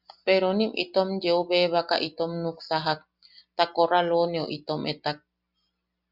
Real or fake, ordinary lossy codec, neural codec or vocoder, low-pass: real; Opus, 64 kbps; none; 5.4 kHz